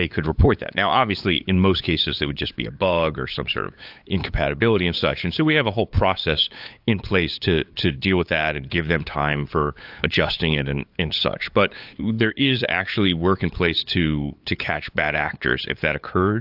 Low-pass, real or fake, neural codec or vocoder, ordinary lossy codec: 5.4 kHz; fake; codec, 16 kHz, 8 kbps, FunCodec, trained on LibriTTS, 25 frames a second; AAC, 48 kbps